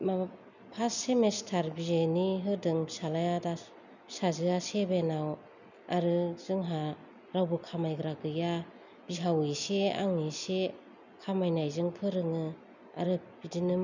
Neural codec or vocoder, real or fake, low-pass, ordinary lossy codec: none; real; 7.2 kHz; none